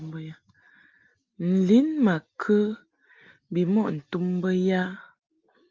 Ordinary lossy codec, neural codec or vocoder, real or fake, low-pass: Opus, 32 kbps; none; real; 7.2 kHz